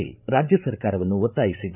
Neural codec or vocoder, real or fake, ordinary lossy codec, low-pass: codec, 16 kHz, 8 kbps, FreqCodec, larger model; fake; none; 3.6 kHz